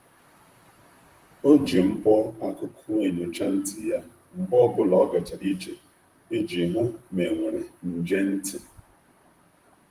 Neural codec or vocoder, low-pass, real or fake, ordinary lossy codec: vocoder, 44.1 kHz, 128 mel bands, Pupu-Vocoder; 14.4 kHz; fake; Opus, 32 kbps